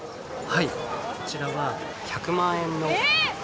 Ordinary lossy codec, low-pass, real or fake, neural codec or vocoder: none; none; real; none